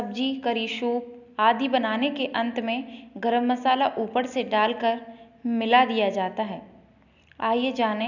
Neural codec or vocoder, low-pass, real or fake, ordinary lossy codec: none; 7.2 kHz; real; none